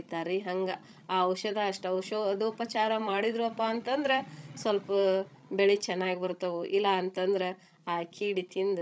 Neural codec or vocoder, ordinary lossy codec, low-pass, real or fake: codec, 16 kHz, 16 kbps, FreqCodec, larger model; none; none; fake